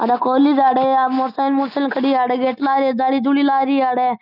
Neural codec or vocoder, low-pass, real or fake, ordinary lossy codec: none; 5.4 kHz; real; none